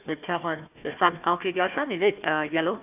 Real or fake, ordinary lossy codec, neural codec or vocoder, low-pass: fake; AAC, 32 kbps; codec, 16 kHz, 1 kbps, FunCodec, trained on Chinese and English, 50 frames a second; 3.6 kHz